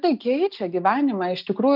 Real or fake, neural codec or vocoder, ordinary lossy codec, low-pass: real; none; Opus, 32 kbps; 5.4 kHz